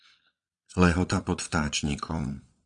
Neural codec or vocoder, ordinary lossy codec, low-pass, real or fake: vocoder, 22.05 kHz, 80 mel bands, Vocos; AAC, 64 kbps; 9.9 kHz; fake